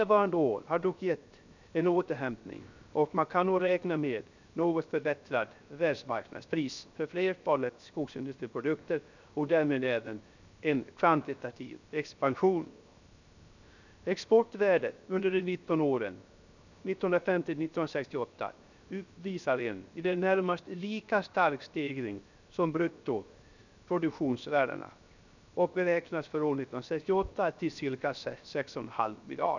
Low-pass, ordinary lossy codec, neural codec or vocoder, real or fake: 7.2 kHz; none; codec, 16 kHz, 0.7 kbps, FocalCodec; fake